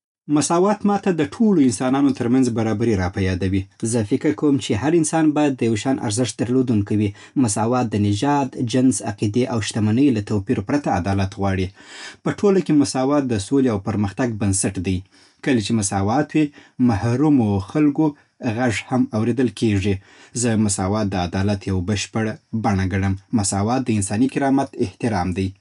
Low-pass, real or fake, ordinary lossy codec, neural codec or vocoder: 10.8 kHz; real; none; none